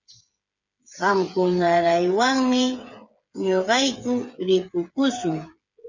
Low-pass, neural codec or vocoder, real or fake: 7.2 kHz; codec, 16 kHz, 8 kbps, FreqCodec, smaller model; fake